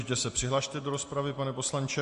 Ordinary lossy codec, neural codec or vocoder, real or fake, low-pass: MP3, 48 kbps; none; real; 14.4 kHz